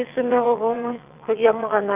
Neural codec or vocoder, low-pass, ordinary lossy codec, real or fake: vocoder, 22.05 kHz, 80 mel bands, WaveNeXt; 3.6 kHz; none; fake